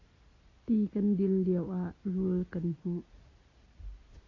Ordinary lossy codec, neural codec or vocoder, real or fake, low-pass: AAC, 32 kbps; none; real; 7.2 kHz